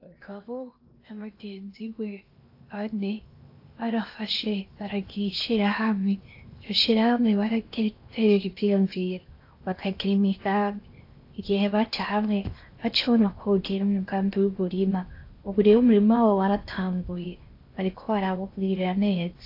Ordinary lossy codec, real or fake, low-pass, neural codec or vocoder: AAC, 32 kbps; fake; 5.4 kHz; codec, 16 kHz in and 24 kHz out, 0.8 kbps, FocalCodec, streaming, 65536 codes